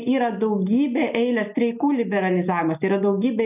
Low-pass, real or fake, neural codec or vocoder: 3.6 kHz; real; none